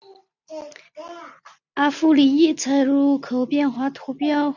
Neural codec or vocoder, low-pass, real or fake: none; 7.2 kHz; real